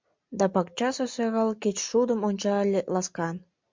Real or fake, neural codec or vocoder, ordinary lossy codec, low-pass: real; none; MP3, 64 kbps; 7.2 kHz